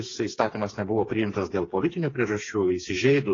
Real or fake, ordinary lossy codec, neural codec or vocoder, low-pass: fake; AAC, 32 kbps; codec, 16 kHz, 4 kbps, FreqCodec, smaller model; 7.2 kHz